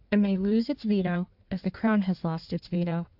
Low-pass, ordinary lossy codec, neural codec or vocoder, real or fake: 5.4 kHz; AAC, 48 kbps; codec, 16 kHz in and 24 kHz out, 1.1 kbps, FireRedTTS-2 codec; fake